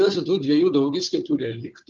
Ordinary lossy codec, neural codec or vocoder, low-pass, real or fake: Opus, 24 kbps; codec, 16 kHz, 4 kbps, FunCodec, trained on Chinese and English, 50 frames a second; 7.2 kHz; fake